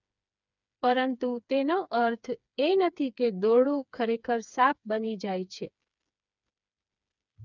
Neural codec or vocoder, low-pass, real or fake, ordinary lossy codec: codec, 16 kHz, 4 kbps, FreqCodec, smaller model; 7.2 kHz; fake; none